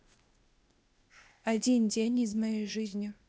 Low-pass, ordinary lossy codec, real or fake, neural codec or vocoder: none; none; fake; codec, 16 kHz, 0.8 kbps, ZipCodec